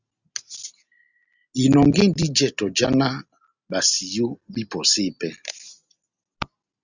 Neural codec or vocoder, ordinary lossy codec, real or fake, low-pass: none; Opus, 64 kbps; real; 7.2 kHz